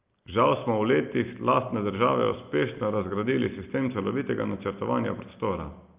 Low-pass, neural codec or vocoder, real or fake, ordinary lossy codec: 3.6 kHz; none; real; Opus, 24 kbps